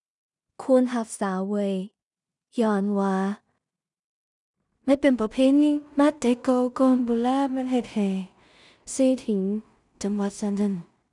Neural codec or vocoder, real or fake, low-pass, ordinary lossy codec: codec, 16 kHz in and 24 kHz out, 0.4 kbps, LongCat-Audio-Codec, two codebook decoder; fake; 10.8 kHz; none